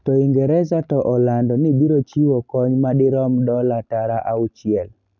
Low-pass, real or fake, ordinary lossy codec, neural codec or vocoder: 7.2 kHz; real; none; none